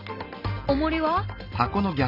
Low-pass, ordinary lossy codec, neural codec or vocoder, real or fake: 5.4 kHz; none; none; real